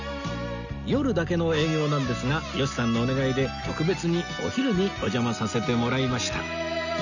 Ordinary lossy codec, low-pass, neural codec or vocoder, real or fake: none; 7.2 kHz; none; real